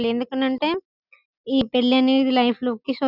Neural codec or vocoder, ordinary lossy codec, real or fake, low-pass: none; none; real; 5.4 kHz